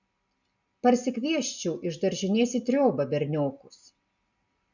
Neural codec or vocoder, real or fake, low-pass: none; real; 7.2 kHz